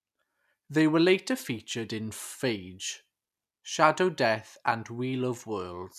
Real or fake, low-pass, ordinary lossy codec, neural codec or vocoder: real; 14.4 kHz; none; none